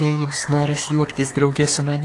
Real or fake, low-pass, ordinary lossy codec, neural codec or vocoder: fake; 10.8 kHz; AAC, 48 kbps; codec, 24 kHz, 1 kbps, SNAC